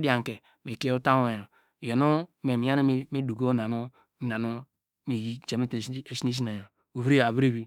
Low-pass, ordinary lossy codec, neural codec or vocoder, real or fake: 19.8 kHz; none; autoencoder, 48 kHz, 32 numbers a frame, DAC-VAE, trained on Japanese speech; fake